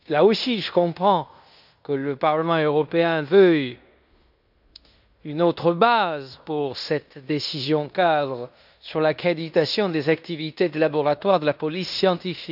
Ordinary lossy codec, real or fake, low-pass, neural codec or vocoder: none; fake; 5.4 kHz; codec, 16 kHz in and 24 kHz out, 0.9 kbps, LongCat-Audio-Codec, fine tuned four codebook decoder